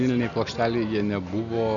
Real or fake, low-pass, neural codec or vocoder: real; 7.2 kHz; none